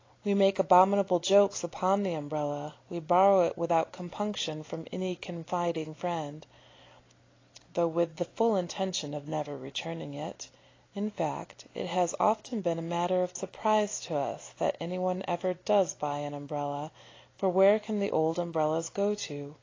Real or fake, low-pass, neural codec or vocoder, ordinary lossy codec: real; 7.2 kHz; none; AAC, 32 kbps